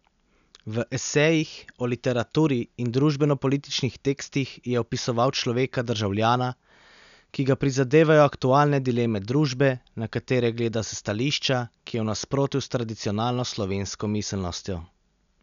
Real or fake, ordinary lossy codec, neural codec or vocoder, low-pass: real; none; none; 7.2 kHz